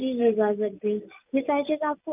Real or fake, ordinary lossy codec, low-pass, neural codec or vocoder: real; none; 3.6 kHz; none